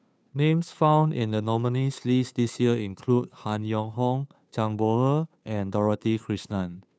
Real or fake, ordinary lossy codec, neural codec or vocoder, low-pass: fake; none; codec, 16 kHz, 2 kbps, FunCodec, trained on Chinese and English, 25 frames a second; none